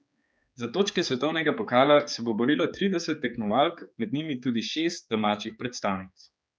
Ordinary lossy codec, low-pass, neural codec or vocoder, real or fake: none; none; codec, 16 kHz, 4 kbps, X-Codec, HuBERT features, trained on general audio; fake